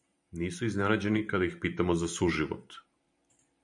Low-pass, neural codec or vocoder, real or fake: 10.8 kHz; vocoder, 44.1 kHz, 128 mel bands every 512 samples, BigVGAN v2; fake